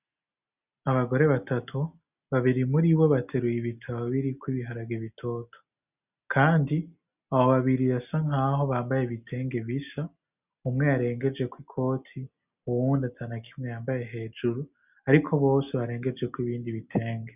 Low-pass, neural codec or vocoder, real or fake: 3.6 kHz; none; real